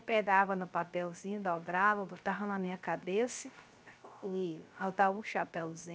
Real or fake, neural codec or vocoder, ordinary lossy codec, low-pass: fake; codec, 16 kHz, 0.3 kbps, FocalCodec; none; none